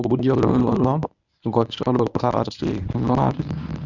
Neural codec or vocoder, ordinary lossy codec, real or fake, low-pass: codec, 24 kHz, 0.9 kbps, WavTokenizer, medium speech release version 1; none; fake; 7.2 kHz